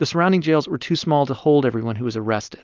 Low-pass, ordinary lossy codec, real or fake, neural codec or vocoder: 7.2 kHz; Opus, 24 kbps; real; none